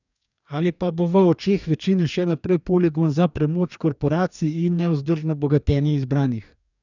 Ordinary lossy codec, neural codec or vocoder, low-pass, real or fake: none; codec, 44.1 kHz, 2.6 kbps, DAC; 7.2 kHz; fake